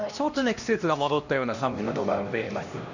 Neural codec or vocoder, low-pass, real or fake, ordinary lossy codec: codec, 16 kHz, 1 kbps, X-Codec, HuBERT features, trained on LibriSpeech; 7.2 kHz; fake; none